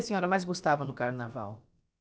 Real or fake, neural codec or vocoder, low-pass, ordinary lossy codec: fake; codec, 16 kHz, about 1 kbps, DyCAST, with the encoder's durations; none; none